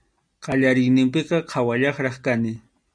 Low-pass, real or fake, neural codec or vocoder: 9.9 kHz; real; none